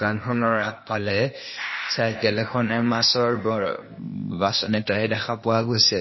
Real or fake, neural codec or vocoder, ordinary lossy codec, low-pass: fake; codec, 16 kHz, 0.8 kbps, ZipCodec; MP3, 24 kbps; 7.2 kHz